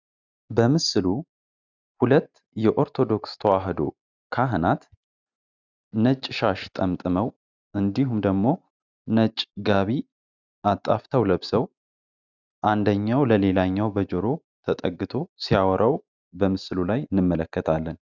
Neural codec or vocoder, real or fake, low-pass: none; real; 7.2 kHz